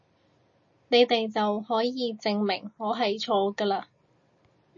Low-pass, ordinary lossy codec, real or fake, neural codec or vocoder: 7.2 kHz; MP3, 32 kbps; real; none